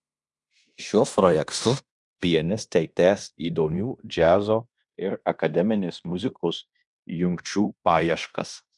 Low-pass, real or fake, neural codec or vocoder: 10.8 kHz; fake; codec, 16 kHz in and 24 kHz out, 0.9 kbps, LongCat-Audio-Codec, fine tuned four codebook decoder